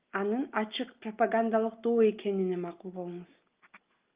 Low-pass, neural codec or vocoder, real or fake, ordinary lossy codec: 3.6 kHz; none; real; Opus, 24 kbps